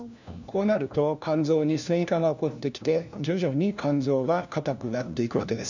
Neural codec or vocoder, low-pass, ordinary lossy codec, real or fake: codec, 16 kHz, 1 kbps, FunCodec, trained on LibriTTS, 50 frames a second; 7.2 kHz; none; fake